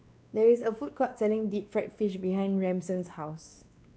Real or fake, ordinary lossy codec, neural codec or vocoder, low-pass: fake; none; codec, 16 kHz, 2 kbps, X-Codec, WavLM features, trained on Multilingual LibriSpeech; none